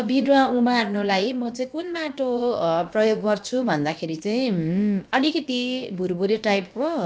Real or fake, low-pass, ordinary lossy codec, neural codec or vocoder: fake; none; none; codec, 16 kHz, about 1 kbps, DyCAST, with the encoder's durations